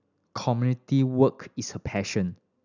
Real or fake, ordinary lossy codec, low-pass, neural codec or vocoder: real; none; 7.2 kHz; none